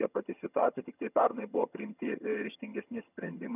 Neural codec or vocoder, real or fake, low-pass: vocoder, 22.05 kHz, 80 mel bands, HiFi-GAN; fake; 3.6 kHz